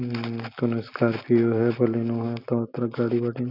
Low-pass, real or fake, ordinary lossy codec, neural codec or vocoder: 5.4 kHz; real; none; none